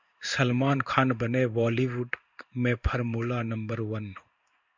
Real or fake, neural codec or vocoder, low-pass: fake; codec, 16 kHz in and 24 kHz out, 1 kbps, XY-Tokenizer; 7.2 kHz